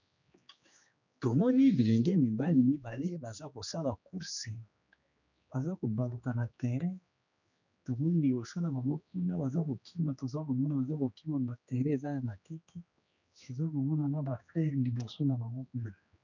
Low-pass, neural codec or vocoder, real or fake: 7.2 kHz; codec, 16 kHz, 2 kbps, X-Codec, HuBERT features, trained on general audio; fake